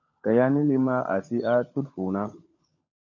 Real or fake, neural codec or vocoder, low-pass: fake; codec, 16 kHz, 16 kbps, FunCodec, trained on LibriTTS, 50 frames a second; 7.2 kHz